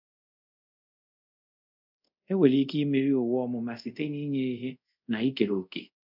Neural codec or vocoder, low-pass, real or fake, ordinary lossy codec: codec, 24 kHz, 0.5 kbps, DualCodec; 5.4 kHz; fake; none